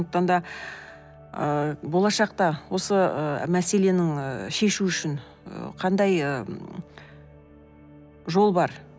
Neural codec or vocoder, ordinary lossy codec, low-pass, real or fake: none; none; none; real